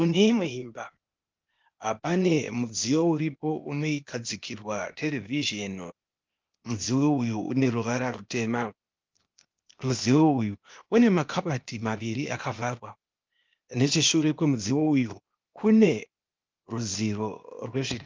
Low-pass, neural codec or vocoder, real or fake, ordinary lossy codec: 7.2 kHz; codec, 16 kHz, 0.8 kbps, ZipCodec; fake; Opus, 24 kbps